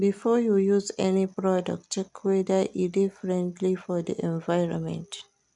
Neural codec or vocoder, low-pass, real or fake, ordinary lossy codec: none; 10.8 kHz; real; none